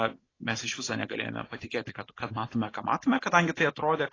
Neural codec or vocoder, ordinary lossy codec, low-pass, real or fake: none; AAC, 32 kbps; 7.2 kHz; real